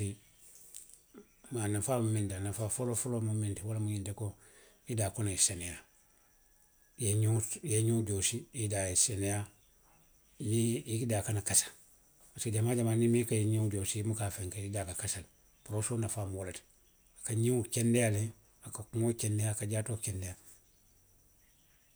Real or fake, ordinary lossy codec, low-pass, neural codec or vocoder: real; none; none; none